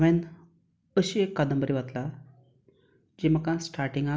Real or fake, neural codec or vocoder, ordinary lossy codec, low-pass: real; none; none; none